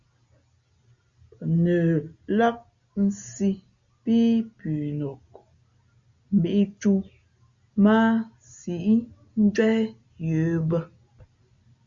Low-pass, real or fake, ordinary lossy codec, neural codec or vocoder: 7.2 kHz; real; Opus, 64 kbps; none